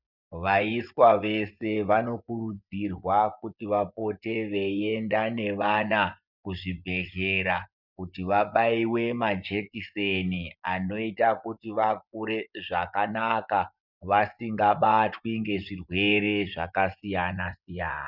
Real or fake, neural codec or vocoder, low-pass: fake; vocoder, 24 kHz, 100 mel bands, Vocos; 5.4 kHz